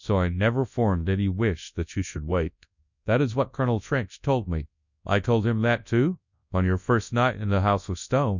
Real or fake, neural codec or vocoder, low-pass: fake; codec, 24 kHz, 0.9 kbps, WavTokenizer, large speech release; 7.2 kHz